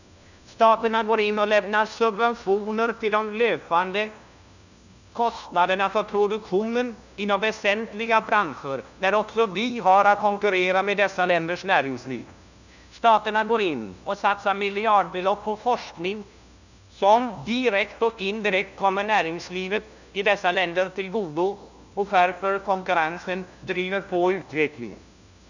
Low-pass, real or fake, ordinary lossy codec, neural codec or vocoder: 7.2 kHz; fake; none; codec, 16 kHz, 1 kbps, FunCodec, trained on LibriTTS, 50 frames a second